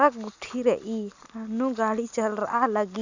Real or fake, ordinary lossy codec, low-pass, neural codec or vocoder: real; Opus, 64 kbps; 7.2 kHz; none